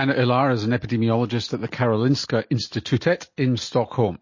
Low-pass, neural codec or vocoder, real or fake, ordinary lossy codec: 7.2 kHz; none; real; MP3, 32 kbps